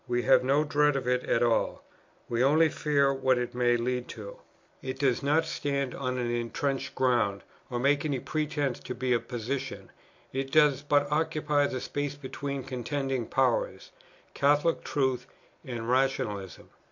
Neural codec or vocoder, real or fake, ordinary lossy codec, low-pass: none; real; MP3, 48 kbps; 7.2 kHz